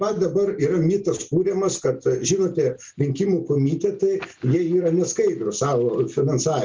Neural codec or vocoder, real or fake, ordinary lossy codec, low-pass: none; real; Opus, 32 kbps; 7.2 kHz